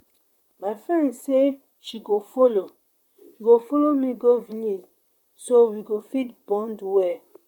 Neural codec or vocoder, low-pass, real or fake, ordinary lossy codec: vocoder, 44.1 kHz, 128 mel bands, Pupu-Vocoder; 19.8 kHz; fake; none